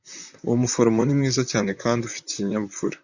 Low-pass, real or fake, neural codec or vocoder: 7.2 kHz; fake; vocoder, 44.1 kHz, 128 mel bands, Pupu-Vocoder